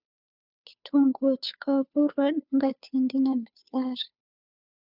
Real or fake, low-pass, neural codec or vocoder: fake; 5.4 kHz; codec, 16 kHz, 2 kbps, FunCodec, trained on Chinese and English, 25 frames a second